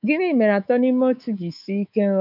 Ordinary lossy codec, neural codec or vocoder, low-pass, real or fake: none; codec, 24 kHz, 3.1 kbps, DualCodec; 5.4 kHz; fake